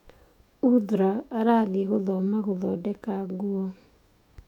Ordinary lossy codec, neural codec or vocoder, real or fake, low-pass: none; autoencoder, 48 kHz, 128 numbers a frame, DAC-VAE, trained on Japanese speech; fake; 19.8 kHz